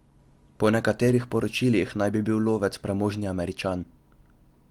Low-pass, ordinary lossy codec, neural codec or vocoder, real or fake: 19.8 kHz; Opus, 24 kbps; none; real